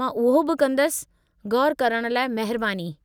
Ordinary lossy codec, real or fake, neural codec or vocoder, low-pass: none; real; none; none